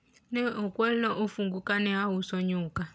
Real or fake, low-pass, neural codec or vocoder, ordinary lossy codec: real; none; none; none